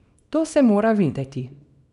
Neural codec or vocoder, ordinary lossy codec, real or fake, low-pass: codec, 24 kHz, 0.9 kbps, WavTokenizer, small release; none; fake; 10.8 kHz